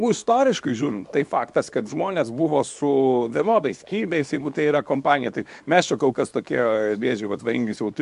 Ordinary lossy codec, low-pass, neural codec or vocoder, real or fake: MP3, 64 kbps; 10.8 kHz; codec, 24 kHz, 0.9 kbps, WavTokenizer, small release; fake